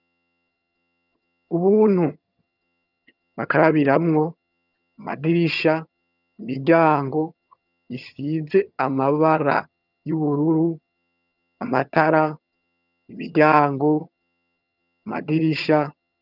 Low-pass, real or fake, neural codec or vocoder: 5.4 kHz; fake; vocoder, 22.05 kHz, 80 mel bands, HiFi-GAN